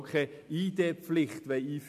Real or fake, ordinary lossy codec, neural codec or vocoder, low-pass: real; AAC, 96 kbps; none; 14.4 kHz